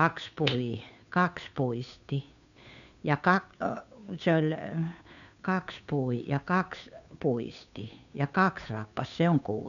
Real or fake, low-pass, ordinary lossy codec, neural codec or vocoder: fake; 7.2 kHz; none; codec, 16 kHz, 2 kbps, FunCodec, trained on Chinese and English, 25 frames a second